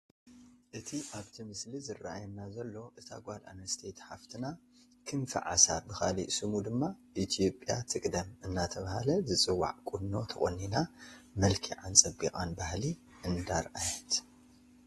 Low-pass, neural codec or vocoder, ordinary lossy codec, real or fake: 19.8 kHz; none; AAC, 32 kbps; real